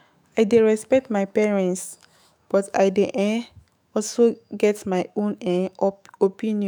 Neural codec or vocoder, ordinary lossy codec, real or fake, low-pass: autoencoder, 48 kHz, 128 numbers a frame, DAC-VAE, trained on Japanese speech; none; fake; none